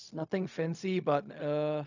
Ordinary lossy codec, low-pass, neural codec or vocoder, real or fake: none; 7.2 kHz; codec, 16 kHz, 0.4 kbps, LongCat-Audio-Codec; fake